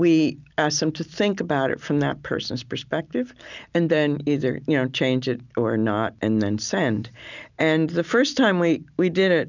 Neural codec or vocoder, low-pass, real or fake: none; 7.2 kHz; real